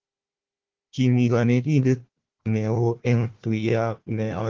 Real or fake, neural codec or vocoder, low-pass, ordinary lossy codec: fake; codec, 16 kHz, 1 kbps, FunCodec, trained on Chinese and English, 50 frames a second; 7.2 kHz; Opus, 16 kbps